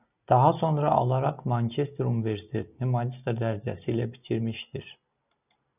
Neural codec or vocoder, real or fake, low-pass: none; real; 3.6 kHz